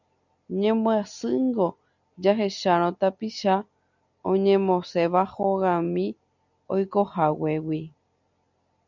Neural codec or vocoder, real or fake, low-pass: none; real; 7.2 kHz